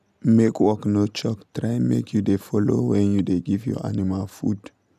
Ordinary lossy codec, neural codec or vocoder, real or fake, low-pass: none; none; real; 14.4 kHz